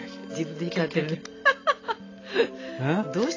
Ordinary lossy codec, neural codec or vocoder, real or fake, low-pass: AAC, 32 kbps; none; real; 7.2 kHz